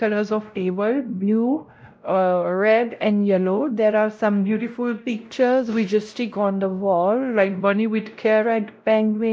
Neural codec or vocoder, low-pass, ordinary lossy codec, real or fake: codec, 16 kHz, 0.5 kbps, X-Codec, WavLM features, trained on Multilingual LibriSpeech; none; none; fake